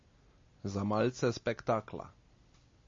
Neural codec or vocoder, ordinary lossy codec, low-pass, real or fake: none; MP3, 32 kbps; 7.2 kHz; real